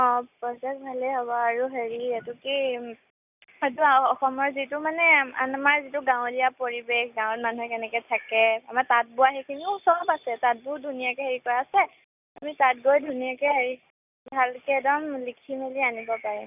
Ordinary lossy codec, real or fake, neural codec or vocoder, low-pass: none; real; none; 3.6 kHz